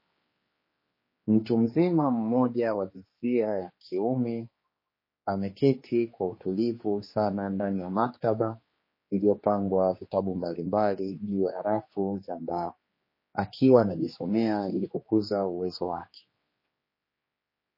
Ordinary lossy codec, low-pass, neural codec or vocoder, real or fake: MP3, 24 kbps; 5.4 kHz; codec, 16 kHz, 2 kbps, X-Codec, HuBERT features, trained on general audio; fake